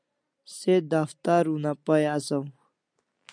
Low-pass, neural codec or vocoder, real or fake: 9.9 kHz; none; real